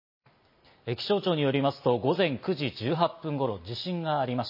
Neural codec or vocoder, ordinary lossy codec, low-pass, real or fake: none; MP3, 24 kbps; 5.4 kHz; real